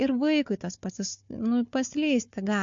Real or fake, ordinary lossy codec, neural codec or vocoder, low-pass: fake; MP3, 48 kbps; codec, 16 kHz, 4 kbps, FunCodec, trained on Chinese and English, 50 frames a second; 7.2 kHz